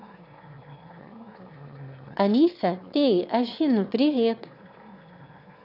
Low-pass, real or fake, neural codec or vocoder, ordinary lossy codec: 5.4 kHz; fake; autoencoder, 22.05 kHz, a latent of 192 numbers a frame, VITS, trained on one speaker; none